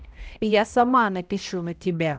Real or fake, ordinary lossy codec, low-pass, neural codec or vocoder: fake; none; none; codec, 16 kHz, 0.5 kbps, X-Codec, HuBERT features, trained on balanced general audio